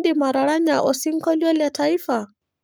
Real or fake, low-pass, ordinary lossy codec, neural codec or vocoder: fake; none; none; codec, 44.1 kHz, 7.8 kbps, Pupu-Codec